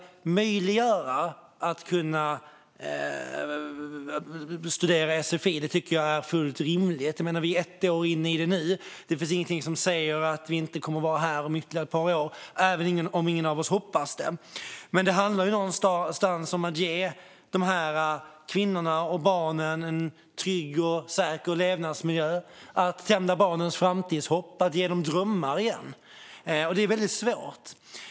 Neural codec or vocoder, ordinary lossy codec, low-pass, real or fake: none; none; none; real